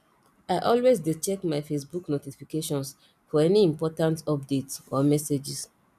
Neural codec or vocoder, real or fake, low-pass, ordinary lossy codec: none; real; 14.4 kHz; none